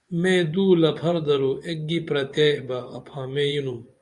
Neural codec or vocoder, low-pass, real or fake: vocoder, 24 kHz, 100 mel bands, Vocos; 10.8 kHz; fake